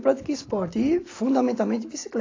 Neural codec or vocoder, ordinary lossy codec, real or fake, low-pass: none; AAC, 32 kbps; real; 7.2 kHz